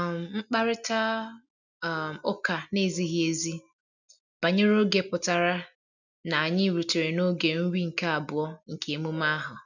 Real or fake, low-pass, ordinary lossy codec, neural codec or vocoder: real; 7.2 kHz; none; none